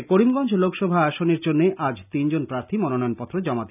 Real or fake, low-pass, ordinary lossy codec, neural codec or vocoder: real; 3.6 kHz; none; none